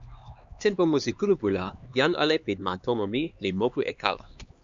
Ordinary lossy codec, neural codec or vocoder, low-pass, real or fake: Opus, 64 kbps; codec, 16 kHz, 2 kbps, X-Codec, HuBERT features, trained on LibriSpeech; 7.2 kHz; fake